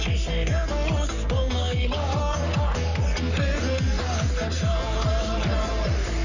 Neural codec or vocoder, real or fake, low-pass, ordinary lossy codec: codec, 44.1 kHz, 3.4 kbps, Pupu-Codec; fake; 7.2 kHz; none